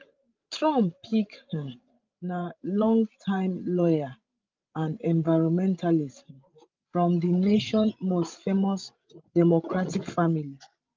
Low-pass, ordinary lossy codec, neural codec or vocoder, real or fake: 7.2 kHz; Opus, 32 kbps; codec, 16 kHz, 16 kbps, FreqCodec, larger model; fake